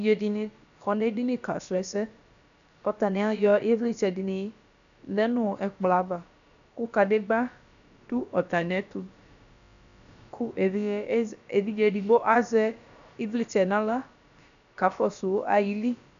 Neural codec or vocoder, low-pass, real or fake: codec, 16 kHz, about 1 kbps, DyCAST, with the encoder's durations; 7.2 kHz; fake